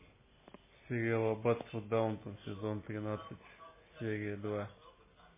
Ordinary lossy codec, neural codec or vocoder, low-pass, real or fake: MP3, 16 kbps; none; 3.6 kHz; real